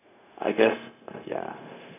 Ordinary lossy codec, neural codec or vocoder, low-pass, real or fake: none; codec, 16 kHz, 0.4 kbps, LongCat-Audio-Codec; 3.6 kHz; fake